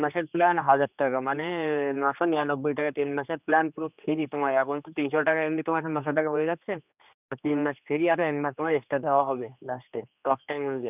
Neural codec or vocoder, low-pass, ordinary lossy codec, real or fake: codec, 16 kHz, 2 kbps, X-Codec, HuBERT features, trained on general audio; 3.6 kHz; none; fake